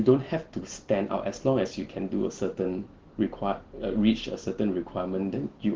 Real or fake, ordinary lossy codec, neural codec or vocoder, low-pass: real; Opus, 16 kbps; none; 7.2 kHz